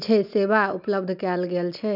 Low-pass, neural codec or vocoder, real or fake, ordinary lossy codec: 5.4 kHz; none; real; none